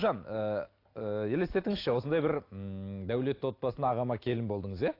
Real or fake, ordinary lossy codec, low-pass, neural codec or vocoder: real; AAC, 32 kbps; 5.4 kHz; none